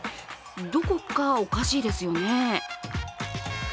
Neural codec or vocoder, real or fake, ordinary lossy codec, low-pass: none; real; none; none